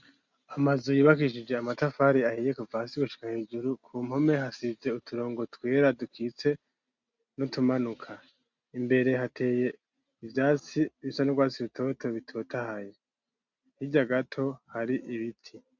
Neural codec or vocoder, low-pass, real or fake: none; 7.2 kHz; real